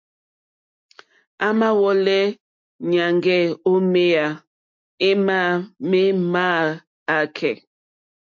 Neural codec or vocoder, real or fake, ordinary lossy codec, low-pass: none; real; MP3, 48 kbps; 7.2 kHz